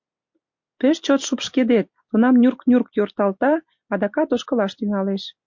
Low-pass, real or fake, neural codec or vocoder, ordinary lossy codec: 7.2 kHz; real; none; MP3, 48 kbps